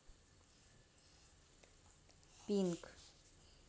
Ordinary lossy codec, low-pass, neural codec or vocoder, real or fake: none; none; none; real